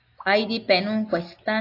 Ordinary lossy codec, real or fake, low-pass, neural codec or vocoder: AAC, 32 kbps; real; 5.4 kHz; none